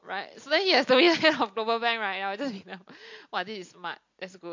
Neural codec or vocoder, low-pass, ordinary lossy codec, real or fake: none; 7.2 kHz; MP3, 48 kbps; real